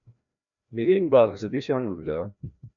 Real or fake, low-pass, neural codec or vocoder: fake; 7.2 kHz; codec, 16 kHz, 1 kbps, FreqCodec, larger model